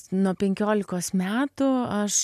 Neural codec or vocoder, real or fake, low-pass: none; real; 14.4 kHz